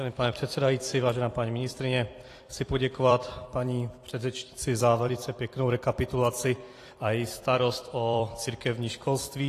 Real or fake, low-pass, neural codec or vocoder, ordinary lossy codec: fake; 14.4 kHz; vocoder, 44.1 kHz, 128 mel bands every 256 samples, BigVGAN v2; AAC, 48 kbps